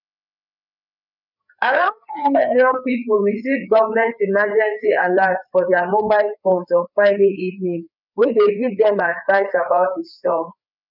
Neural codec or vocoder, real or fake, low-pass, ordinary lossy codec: codec, 16 kHz, 4 kbps, FreqCodec, larger model; fake; 5.4 kHz; none